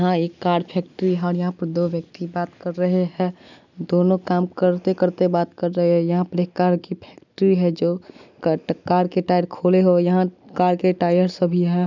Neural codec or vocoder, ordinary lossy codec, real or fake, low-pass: none; none; real; 7.2 kHz